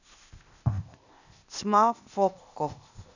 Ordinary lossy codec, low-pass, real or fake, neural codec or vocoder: none; 7.2 kHz; fake; codec, 16 kHz, 0.8 kbps, ZipCodec